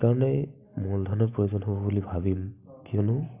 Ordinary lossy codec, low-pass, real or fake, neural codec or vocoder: AAC, 32 kbps; 3.6 kHz; real; none